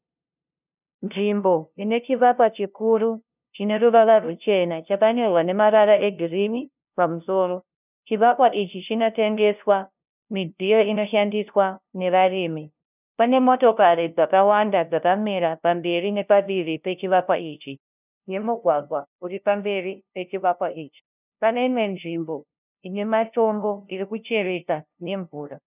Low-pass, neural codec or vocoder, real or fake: 3.6 kHz; codec, 16 kHz, 0.5 kbps, FunCodec, trained on LibriTTS, 25 frames a second; fake